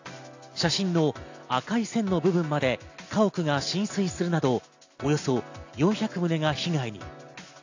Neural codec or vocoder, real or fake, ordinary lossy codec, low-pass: none; real; AAC, 48 kbps; 7.2 kHz